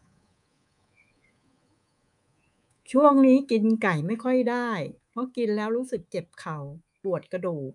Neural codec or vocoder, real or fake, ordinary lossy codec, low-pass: codec, 24 kHz, 3.1 kbps, DualCodec; fake; none; 10.8 kHz